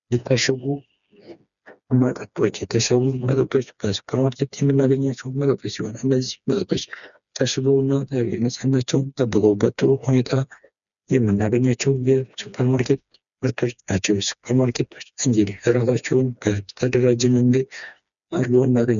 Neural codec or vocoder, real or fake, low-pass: codec, 16 kHz, 2 kbps, FreqCodec, smaller model; fake; 7.2 kHz